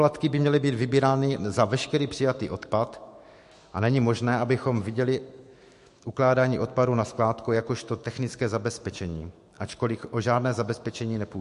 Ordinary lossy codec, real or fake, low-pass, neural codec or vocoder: MP3, 48 kbps; fake; 14.4 kHz; autoencoder, 48 kHz, 128 numbers a frame, DAC-VAE, trained on Japanese speech